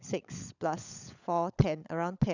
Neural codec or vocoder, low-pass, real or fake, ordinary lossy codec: codec, 16 kHz, 16 kbps, FunCodec, trained on LibriTTS, 50 frames a second; 7.2 kHz; fake; none